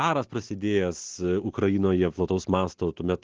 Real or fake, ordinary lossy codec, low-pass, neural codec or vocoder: real; Opus, 16 kbps; 7.2 kHz; none